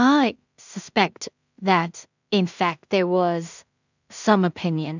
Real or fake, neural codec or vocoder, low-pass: fake; codec, 16 kHz in and 24 kHz out, 0.4 kbps, LongCat-Audio-Codec, two codebook decoder; 7.2 kHz